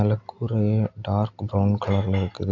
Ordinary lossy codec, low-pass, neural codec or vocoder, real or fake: MP3, 64 kbps; 7.2 kHz; none; real